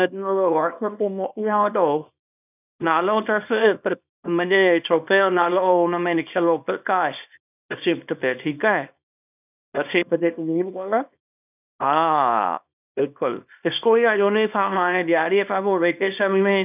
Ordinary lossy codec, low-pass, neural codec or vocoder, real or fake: none; 3.6 kHz; codec, 24 kHz, 0.9 kbps, WavTokenizer, small release; fake